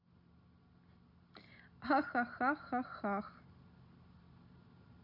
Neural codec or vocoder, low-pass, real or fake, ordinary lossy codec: none; 5.4 kHz; real; none